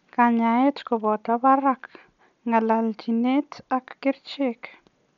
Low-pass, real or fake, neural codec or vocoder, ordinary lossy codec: 7.2 kHz; real; none; none